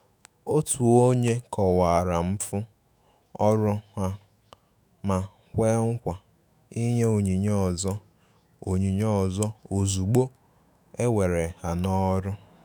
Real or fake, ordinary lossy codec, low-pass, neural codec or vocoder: fake; none; none; autoencoder, 48 kHz, 128 numbers a frame, DAC-VAE, trained on Japanese speech